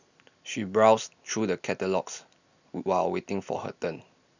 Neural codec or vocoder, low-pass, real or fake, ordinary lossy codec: none; 7.2 kHz; real; none